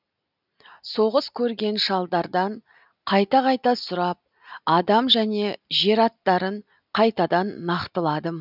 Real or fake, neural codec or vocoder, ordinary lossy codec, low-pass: real; none; none; 5.4 kHz